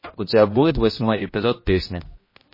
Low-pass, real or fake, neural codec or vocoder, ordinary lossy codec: 5.4 kHz; fake; codec, 16 kHz, 1 kbps, X-Codec, HuBERT features, trained on general audio; MP3, 24 kbps